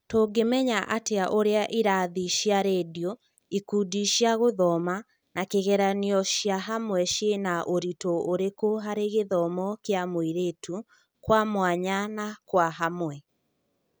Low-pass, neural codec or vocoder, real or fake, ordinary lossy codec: none; none; real; none